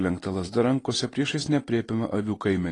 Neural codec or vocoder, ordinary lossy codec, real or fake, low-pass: none; AAC, 32 kbps; real; 10.8 kHz